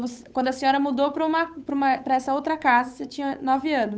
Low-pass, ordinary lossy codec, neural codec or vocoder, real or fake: none; none; codec, 16 kHz, 8 kbps, FunCodec, trained on Chinese and English, 25 frames a second; fake